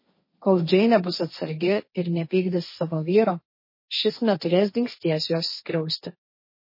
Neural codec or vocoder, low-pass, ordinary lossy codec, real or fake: codec, 16 kHz, 1.1 kbps, Voila-Tokenizer; 5.4 kHz; MP3, 24 kbps; fake